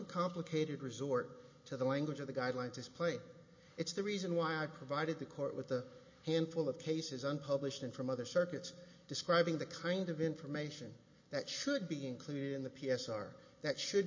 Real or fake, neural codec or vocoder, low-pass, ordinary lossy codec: real; none; 7.2 kHz; MP3, 32 kbps